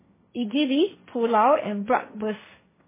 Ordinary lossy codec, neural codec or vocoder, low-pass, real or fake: MP3, 16 kbps; codec, 16 kHz, 1.1 kbps, Voila-Tokenizer; 3.6 kHz; fake